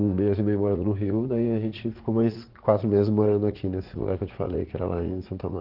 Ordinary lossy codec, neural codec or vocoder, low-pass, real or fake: Opus, 16 kbps; vocoder, 44.1 kHz, 80 mel bands, Vocos; 5.4 kHz; fake